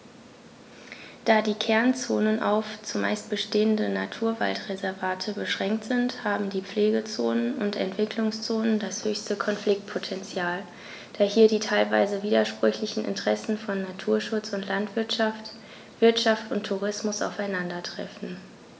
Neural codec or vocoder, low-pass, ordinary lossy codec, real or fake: none; none; none; real